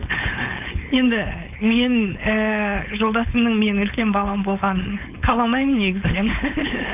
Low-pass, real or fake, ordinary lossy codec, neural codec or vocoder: 3.6 kHz; fake; AAC, 32 kbps; codec, 16 kHz, 4.8 kbps, FACodec